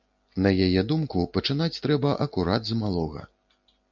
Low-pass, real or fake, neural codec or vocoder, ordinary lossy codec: 7.2 kHz; real; none; MP3, 64 kbps